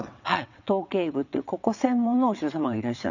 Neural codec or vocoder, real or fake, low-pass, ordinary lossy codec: vocoder, 22.05 kHz, 80 mel bands, WaveNeXt; fake; 7.2 kHz; none